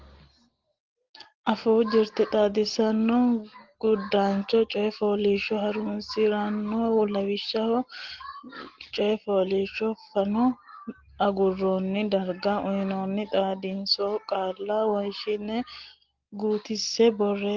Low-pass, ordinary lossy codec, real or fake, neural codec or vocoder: 7.2 kHz; Opus, 16 kbps; real; none